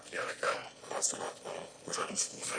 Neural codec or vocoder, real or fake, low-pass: autoencoder, 22.05 kHz, a latent of 192 numbers a frame, VITS, trained on one speaker; fake; 9.9 kHz